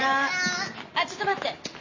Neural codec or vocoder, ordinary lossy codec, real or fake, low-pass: none; MP3, 32 kbps; real; 7.2 kHz